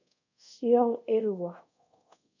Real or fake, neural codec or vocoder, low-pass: fake; codec, 24 kHz, 0.5 kbps, DualCodec; 7.2 kHz